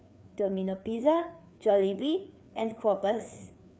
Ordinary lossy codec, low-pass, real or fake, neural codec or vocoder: none; none; fake; codec, 16 kHz, 4 kbps, FreqCodec, larger model